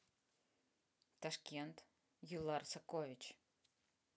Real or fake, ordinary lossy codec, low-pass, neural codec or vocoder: real; none; none; none